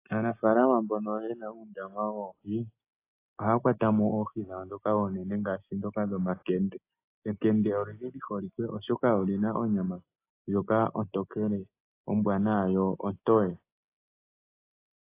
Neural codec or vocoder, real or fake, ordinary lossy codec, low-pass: none; real; AAC, 24 kbps; 3.6 kHz